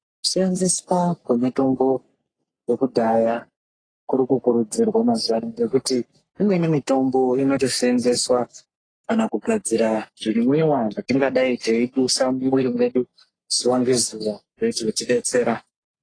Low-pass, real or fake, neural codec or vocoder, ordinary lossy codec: 9.9 kHz; fake; codec, 44.1 kHz, 3.4 kbps, Pupu-Codec; AAC, 32 kbps